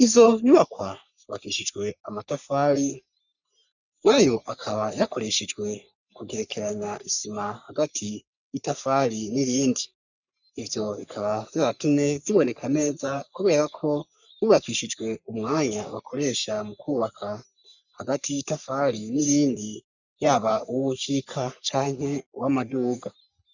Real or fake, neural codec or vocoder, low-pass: fake; codec, 44.1 kHz, 3.4 kbps, Pupu-Codec; 7.2 kHz